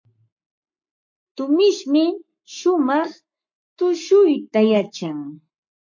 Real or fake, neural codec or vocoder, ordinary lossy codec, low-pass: fake; codec, 44.1 kHz, 7.8 kbps, Pupu-Codec; MP3, 48 kbps; 7.2 kHz